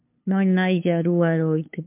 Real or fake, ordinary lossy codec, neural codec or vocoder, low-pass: fake; MP3, 32 kbps; codec, 16 kHz, 2 kbps, FunCodec, trained on LibriTTS, 25 frames a second; 3.6 kHz